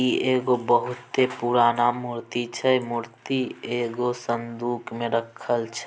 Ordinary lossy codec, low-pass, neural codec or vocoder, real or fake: none; none; none; real